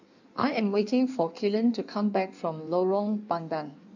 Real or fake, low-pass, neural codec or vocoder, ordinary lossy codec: fake; 7.2 kHz; codec, 16 kHz in and 24 kHz out, 1.1 kbps, FireRedTTS-2 codec; none